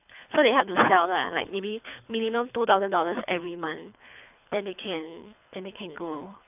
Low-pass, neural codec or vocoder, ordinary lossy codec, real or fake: 3.6 kHz; codec, 24 kHz, 3 kbps, HILCodec; none; fake